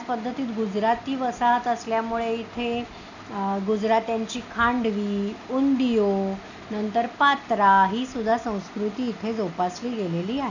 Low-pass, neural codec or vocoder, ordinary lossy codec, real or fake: 7.2 kHz; none; none; real